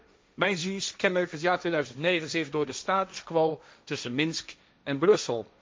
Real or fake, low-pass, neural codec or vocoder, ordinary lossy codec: fake; none; codec, 16 kHz, 1.1 kbps, Voila-Tokenizer; none